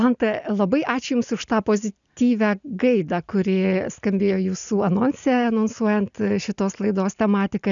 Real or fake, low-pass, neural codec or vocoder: real; 7.2 kHz; none